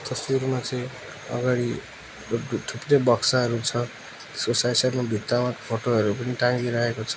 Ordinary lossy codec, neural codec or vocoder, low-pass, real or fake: none; none; none; real